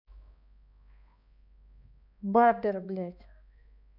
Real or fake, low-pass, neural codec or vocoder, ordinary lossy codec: fake; 5.4 kHz; codec, 16 kHz, 2 kbps, X-Codec, HuBERT features, trained on balanced general audio; none